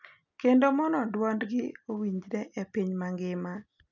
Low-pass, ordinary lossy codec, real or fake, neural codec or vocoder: 7.2 kHz; none; real; none